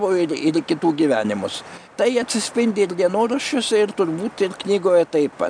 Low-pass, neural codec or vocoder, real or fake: 9.9 kHz; none; real